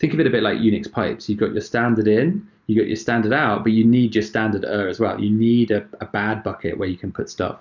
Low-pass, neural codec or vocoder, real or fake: 7.2 kHz; none; real